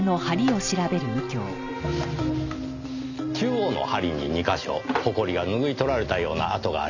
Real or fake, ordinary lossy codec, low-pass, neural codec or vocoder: real; none; 7.2 kHz; none